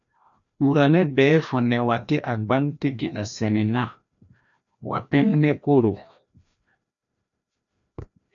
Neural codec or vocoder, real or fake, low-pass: codec, 16 kHz, 1 kbps, FreqCodec, larger model; fake; 7.2 kHz